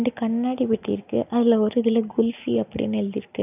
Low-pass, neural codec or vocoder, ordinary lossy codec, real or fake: 3.6 kHz; none; none; real